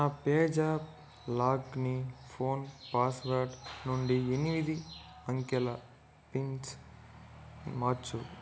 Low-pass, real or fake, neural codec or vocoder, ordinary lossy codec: none; real; none; none